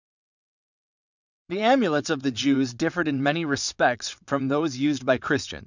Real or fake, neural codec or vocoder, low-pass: fake; vocoder, 22.05 kHz, 80 mel bands, WaveNeXt; 7.2 kHz